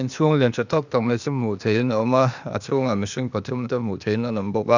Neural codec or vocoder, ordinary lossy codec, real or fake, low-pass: codec, 16 kHz, 0.8 kbps, ZipCodec; none; fake; 7.2 kHz